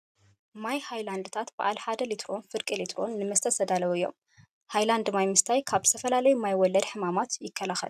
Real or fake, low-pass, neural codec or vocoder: real; 14.4 kHz; none